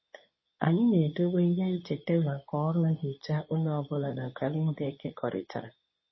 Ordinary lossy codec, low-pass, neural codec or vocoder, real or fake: MP3, 24 kbps; 7.2 kHz; codec, 24 kHz, 0.9 kbps, WavTokenizer, medium speech release version 2; fake